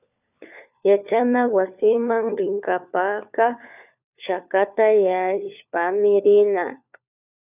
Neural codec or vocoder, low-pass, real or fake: codec, 16 kHz, 4 kbps, FunCodec, trained on LibriTTS, 50 frames a second; 3.6 kHz; fake